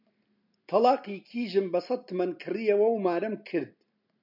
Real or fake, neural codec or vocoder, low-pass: real; none; 5.4 kHz